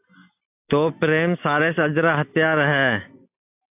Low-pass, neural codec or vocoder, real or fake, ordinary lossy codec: 3.6 kHz; none; real; AAC, 32 kbps